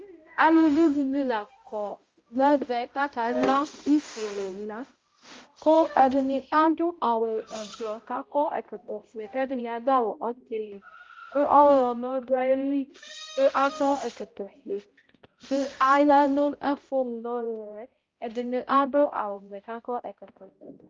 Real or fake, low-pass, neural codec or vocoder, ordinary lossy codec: fake; 7.2 kHz; codec, 16 kHz, 0.5 kbps, X-Codec, HuBERT features, trained on balanced general audio; Opus, 32 kbps